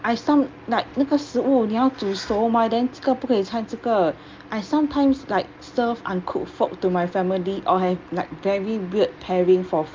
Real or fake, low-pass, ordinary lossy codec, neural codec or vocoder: real; 7.2 kHz; Opus, 24 kbps; none